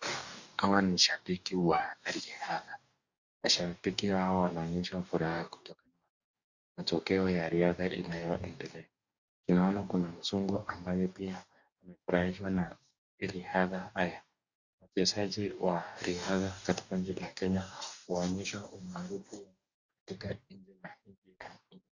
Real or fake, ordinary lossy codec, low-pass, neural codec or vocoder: fake; Opus, 64 kbps; 7.2 kHz; codec, 44.1 kHz, 2.6 kbps, DAC